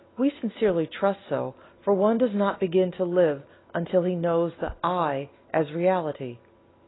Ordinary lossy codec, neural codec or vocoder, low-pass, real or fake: AAC, 16 kbps; none; 7.2 kHz; real